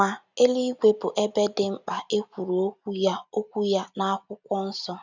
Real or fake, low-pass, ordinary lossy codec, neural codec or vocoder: real; 7.2 kHz; none; none